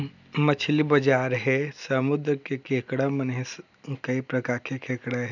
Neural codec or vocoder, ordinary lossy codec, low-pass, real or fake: none; none; 7.2 kHz; real